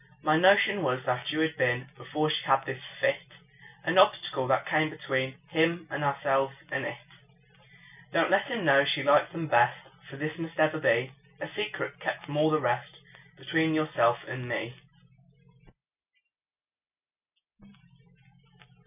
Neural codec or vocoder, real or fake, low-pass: none; real; 3.6 kHz